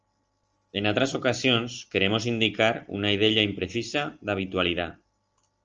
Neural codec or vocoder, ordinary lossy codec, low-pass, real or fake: none; Opus, 24 kbps; 7.2 kHz; real